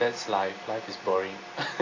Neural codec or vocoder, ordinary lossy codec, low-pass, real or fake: none; AAC, 32 kbps; 7.2 kHz; real